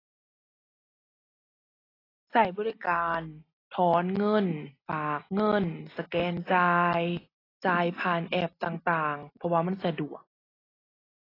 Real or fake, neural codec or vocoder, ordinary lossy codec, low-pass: real; none; AAC, 24 kbps; 5.4 kHz